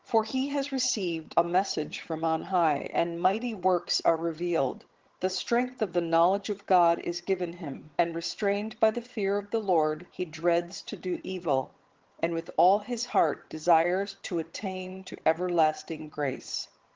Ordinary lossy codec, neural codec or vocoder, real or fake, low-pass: Opus, 16 kbps; vocoder, 22.05 kHz, 80 mel bands, HiFi-GAN; fake; 7.2 kHz